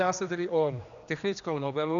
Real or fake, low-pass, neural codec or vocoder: fake; 7.2 kHz; codec, 16 kHz, 2 kbps, X-Codec, HuBERT features, trained on general audio